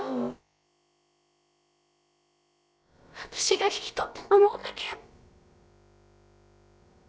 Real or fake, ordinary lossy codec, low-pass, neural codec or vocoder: fake; none; none; codec, 16 kHz, about 1 kbps, DyCAST, with the encoder's durations